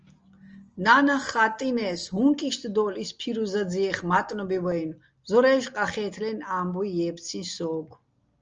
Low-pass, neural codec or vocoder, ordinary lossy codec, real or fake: 7.2 kHz; none; Opus, 24 kbps; real